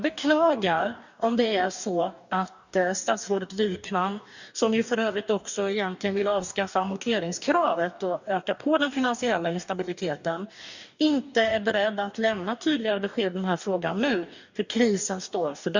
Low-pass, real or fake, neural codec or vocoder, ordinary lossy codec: 7.2 kHz; fake; codec, 44.1 kHz, 2.6 kbps, DAC; none